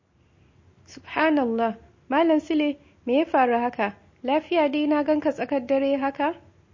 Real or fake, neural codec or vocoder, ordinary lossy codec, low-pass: real; none; MP3, 32 kbps; 7.2 kHz